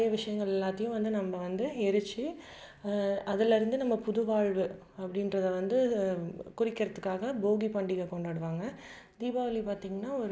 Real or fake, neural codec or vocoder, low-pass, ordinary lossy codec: real; none; none; none